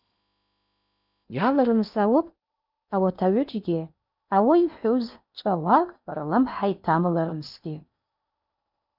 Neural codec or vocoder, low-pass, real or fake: codec, 16 kHz in and 24 kHz out, 0.8 kbps, FocalCodec, streaming, 65536 codes; 5.4 kHz; fake